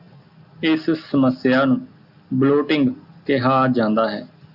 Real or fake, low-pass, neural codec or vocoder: real; 5.4 kHz; none